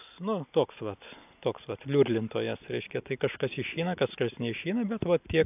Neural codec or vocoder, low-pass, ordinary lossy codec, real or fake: none; 3.6 kHz; AAC, 32 kbps; real